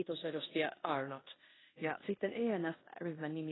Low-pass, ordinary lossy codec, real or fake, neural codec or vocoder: 7.2 kHz; AAC, 16 kbps; fake; codec, 16 kHz in and 24 kHz out, 0.9 kbps, LongCat-Audio-Codec, four codebook decoder